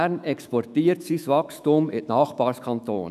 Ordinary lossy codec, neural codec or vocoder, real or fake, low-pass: none; autoencoder, 48 kHz, 128 numbers a frame, DAC-VAE, trained on Japanese speech; fake; 14.4 kHz